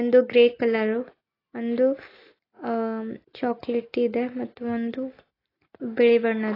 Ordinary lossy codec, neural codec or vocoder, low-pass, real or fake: AAC, 24 kbps; none; 5.4 kHz; real